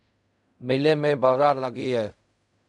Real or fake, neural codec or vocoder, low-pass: fake; codec, 16 kHz in and 24 kHz out, 0.4 kbps, LongCat-Audio-Codec, fine tuned four codebook decoder; 10.8 kHz